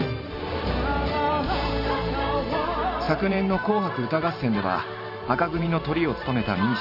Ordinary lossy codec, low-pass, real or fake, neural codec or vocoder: none; 5.4 kHz; fake; vocoder, 44.1 kHz, 128 mel bands every 512 samples, BigVGAN v2